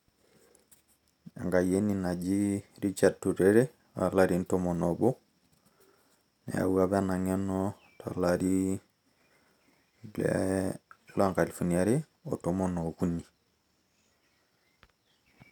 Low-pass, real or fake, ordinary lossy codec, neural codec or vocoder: 19.8 kHz; real; none; none